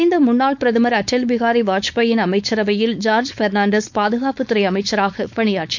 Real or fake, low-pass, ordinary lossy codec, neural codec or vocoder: fake; 7.2 kHz; none; codec, 16 kHz, 4.8 kbps, FACodec